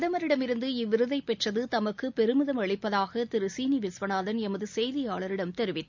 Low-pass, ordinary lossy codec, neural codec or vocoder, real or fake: 7.2 kHz; MP3, 64 kbps; none; real